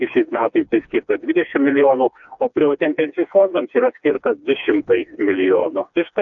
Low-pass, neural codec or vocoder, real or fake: 7.2 kHz; codec, 16 kHz, 2 kbps, FreqCodec, smaller model; fake